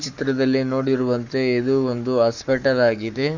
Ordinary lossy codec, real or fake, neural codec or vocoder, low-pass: Opus, 64 kbps; fake; codec, 44.1 kHz, 7.8 kbps, Pupu-Codec; 7.2 kHz